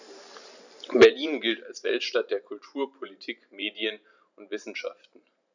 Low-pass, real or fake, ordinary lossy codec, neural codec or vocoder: 7.2 kHz; fake; none; vocoder, 44.1 kHz, 128 mel bands every 512 samples, BigVGAN v2